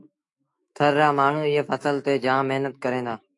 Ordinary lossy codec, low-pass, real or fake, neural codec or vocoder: AAC, 48 kbps; 10.8 kHz; fake; autoencoder, 48 kHz, 128 numbers a frame, DAC-VAE, trained on Japanese speech